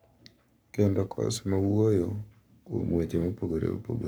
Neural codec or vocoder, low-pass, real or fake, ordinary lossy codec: codec, 44.1 kHz, 7.8 kbps, Pupu-Codec; none; fake; none